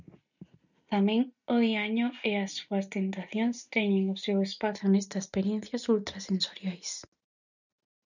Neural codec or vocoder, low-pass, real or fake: none; 7.2 kHz; real